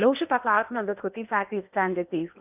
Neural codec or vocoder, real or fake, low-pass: codec, 16 kHz in and 24 kHz out, 0.8 kbps, FocalCodec, streaming, 65536 codes; fake; 3.6 kHz